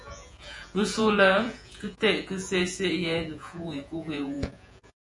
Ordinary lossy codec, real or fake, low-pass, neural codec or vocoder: MP3, 48 kbps; fake; 10.8 kHz; vocoder, 48 kHz, 128 mel bands, Vocos